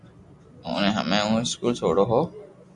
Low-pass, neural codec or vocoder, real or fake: 10.8 kHz; none; real